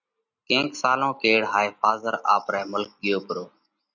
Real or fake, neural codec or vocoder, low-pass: real; none; 7.2 kHz